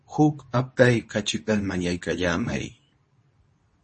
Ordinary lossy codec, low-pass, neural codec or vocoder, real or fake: MP3, 32 kbps; 10.8 kHz; codec, 24 kHz, 0.9 kbps, WavTokenizer, medium speech release version 2; fake